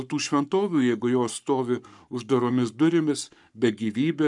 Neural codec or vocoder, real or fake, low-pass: codec, 44.1 kHz, 7.8 kbps, Pupu-Codec; fake; 10.8 kHz